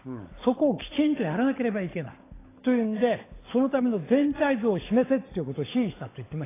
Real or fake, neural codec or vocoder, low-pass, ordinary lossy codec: fake; codec, 16 kHz, 16 kbps, FunCodec, trained on LibriTTS, 50 frames a second; 3.6 kHz; AAC, 16 kbps